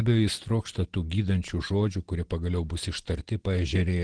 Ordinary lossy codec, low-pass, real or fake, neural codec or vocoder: Opus, 16 kbps; 9.9 kHz; real; none